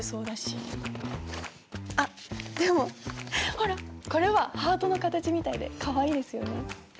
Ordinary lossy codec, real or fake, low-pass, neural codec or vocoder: none; real; none; none